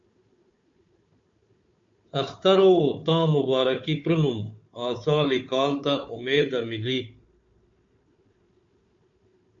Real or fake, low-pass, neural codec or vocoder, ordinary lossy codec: fake; 7.2 kHz; codec, 16 kHz, 4 kbps, FunCodec, trained on Chinese and English, 50 frames a second; MP3, 48 kbps